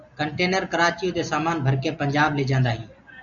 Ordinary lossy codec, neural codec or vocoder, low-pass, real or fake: MP3, 96 kbps; none; 7.2 kHz; real